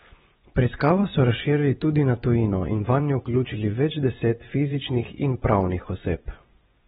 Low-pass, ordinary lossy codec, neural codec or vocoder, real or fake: 10.8 kHz; AAC, 16 kbps; vocoder, 24 kHz, 100 mel bands, Vocos; fake